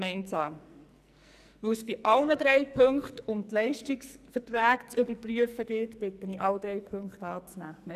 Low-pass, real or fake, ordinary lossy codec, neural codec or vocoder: 14.4 kHz; fake; none; codec, 44.1 kHz, 2.6 kbps, SNAC